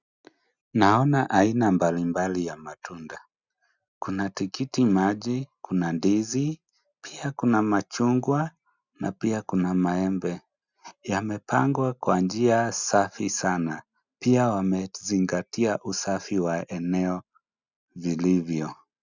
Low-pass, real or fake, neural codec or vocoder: 7.2 kHz; real; none